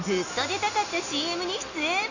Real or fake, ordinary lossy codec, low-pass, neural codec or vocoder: real; none; 7.2 kHz; none